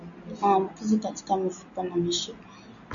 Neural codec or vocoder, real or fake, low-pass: none; real; 7.2 kHz